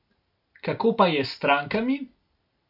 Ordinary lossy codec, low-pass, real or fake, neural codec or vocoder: none; 5.4 kHz; real; none